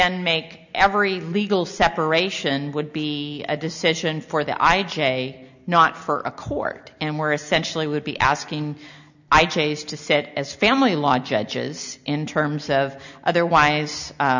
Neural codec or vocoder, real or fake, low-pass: none; real; 7.2 kHz